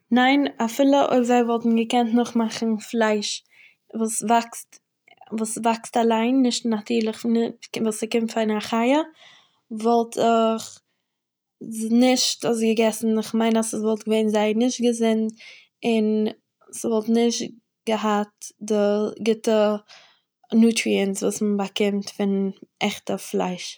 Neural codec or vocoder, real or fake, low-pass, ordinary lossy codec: none; real; none; none